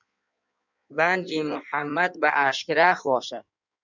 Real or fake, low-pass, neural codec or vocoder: fake; 7.2 kHz; codec, 16 kHz in and 24 kHz out, 1.1 kbps, FireRedTTS-2 codec